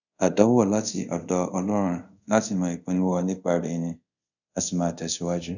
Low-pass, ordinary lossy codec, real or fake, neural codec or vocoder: 7.2 kHz; none; fake; codec, 24 kHz, 0.5 kbps, DualCodec